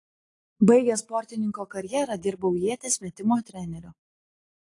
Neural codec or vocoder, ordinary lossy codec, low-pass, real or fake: none; AAC, 48 kbps; 10.8 kHz; real